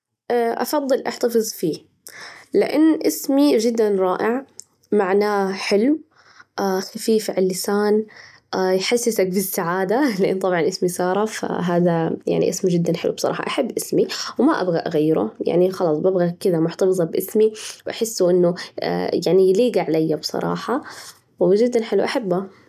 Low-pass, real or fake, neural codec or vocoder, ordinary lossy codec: 14.4 kHz; real; none; none